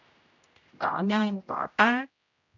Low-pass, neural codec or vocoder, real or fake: 7.2 kHz; codec, 16 kHz, 0.5 kbps, X-Codec, HuBERT features, trained on general audio; fake